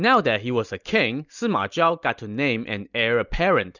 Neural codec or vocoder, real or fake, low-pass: none; real; 7.2 kHz